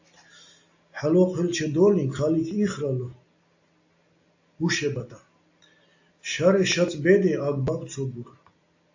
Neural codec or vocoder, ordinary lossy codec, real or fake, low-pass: none; AAC, 48 kbps; real; 7.2 kHz